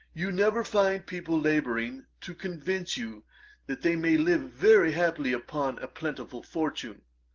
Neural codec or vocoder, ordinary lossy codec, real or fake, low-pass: none; Opus, 16 kbps; real; 7.2 kHz